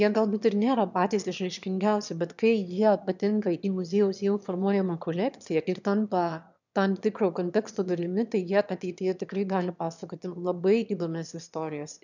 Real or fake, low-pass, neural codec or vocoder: fake; 7.2 kHz; autoencoder, 22.05 kHz, a latent of 192 numbers a frame, VITS, trained on one speaker